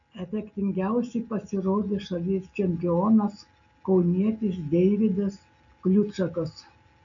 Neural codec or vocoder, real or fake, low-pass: none; real; 7.2 kHz